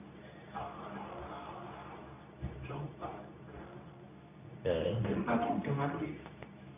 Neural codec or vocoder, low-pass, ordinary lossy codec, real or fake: codec, 24 kHz, 0.9 kbps, WavTokenizer, medium speech release version 2; 3.6 kHz; none; fake